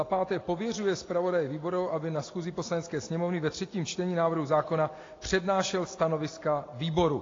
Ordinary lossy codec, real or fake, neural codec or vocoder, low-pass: AAC, 32 kbps; real; none; 7.2 kHz